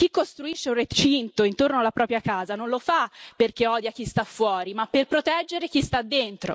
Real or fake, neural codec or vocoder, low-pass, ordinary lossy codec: real; none; none; none